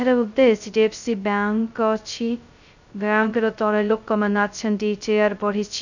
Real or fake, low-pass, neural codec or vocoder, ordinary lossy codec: fake; 7.2 kHz; codec, 16 kHz, 0.2 kbps, FocalCodec; none